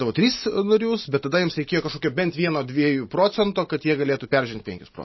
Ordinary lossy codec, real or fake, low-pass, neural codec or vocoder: MP3, 24 kbps; real; 7.2 kHz; none